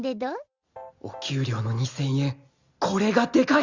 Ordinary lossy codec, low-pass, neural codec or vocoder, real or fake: Opus, 64 kbps; 7.2 kHz; none; real